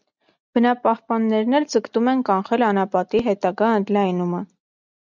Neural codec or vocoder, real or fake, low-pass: none; real; 7.2 kHz